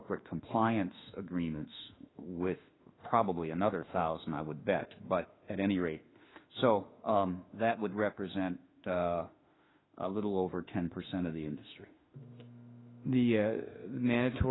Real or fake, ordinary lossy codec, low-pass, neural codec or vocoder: fake; AAC, 16 kbps; 7.2 kHz; autoencoder, 48 kHz, 32 numbers a frame, DAC-VAE, trained on Japanese speech